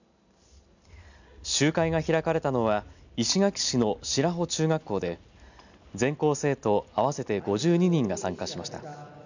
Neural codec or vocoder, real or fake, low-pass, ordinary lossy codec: none; real; 7.2 kHz; none